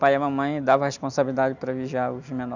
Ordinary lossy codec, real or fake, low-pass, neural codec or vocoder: none; real; 7.2 kHz; none